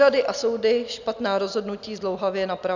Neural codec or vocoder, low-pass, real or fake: none; 7.2 kHz; real